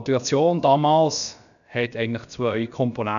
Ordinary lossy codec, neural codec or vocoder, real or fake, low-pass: none; codec, 16 kHz, about 1 kbps, DyCAST, with the encoder's durations; fake; 7.2 kHz